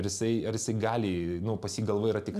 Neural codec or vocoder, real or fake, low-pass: none; real; 14.4 kHz